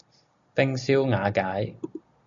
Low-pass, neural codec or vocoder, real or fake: 7.2 kHz; none; real